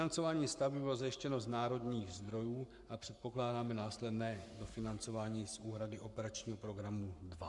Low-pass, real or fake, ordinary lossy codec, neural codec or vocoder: 10.8 kHz; fake; MP3, 96 kbps; codec, 44.1 kHz, 7.8 kbps, Pupu-Codec